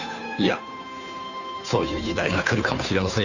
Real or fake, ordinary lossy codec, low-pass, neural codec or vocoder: fake; none; 7.2 kHz; codec, 16 kHz, 2 kbps, FunCodec, trained on Chinese and English, 25 frames a second